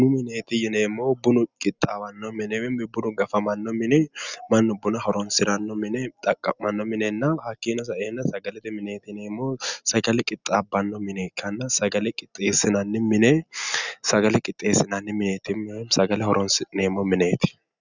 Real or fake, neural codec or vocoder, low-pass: real; none; 7.2 kHz